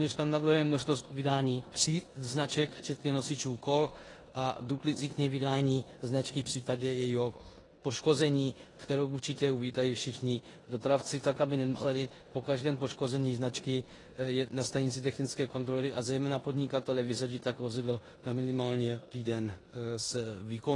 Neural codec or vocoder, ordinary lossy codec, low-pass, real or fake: codec, 16 kHz in and 24 kHz out, 0.9 kbps, LongCat-Audio-Codec, four codebook decoder; AAC, 32 kbps; 10.8 kHz; fake